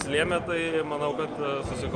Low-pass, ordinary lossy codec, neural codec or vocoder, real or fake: 9.9 kHz; Opus, 32 kbps; none; real